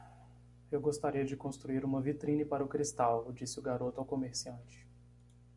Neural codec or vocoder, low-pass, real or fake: none; 10.8 kHz; real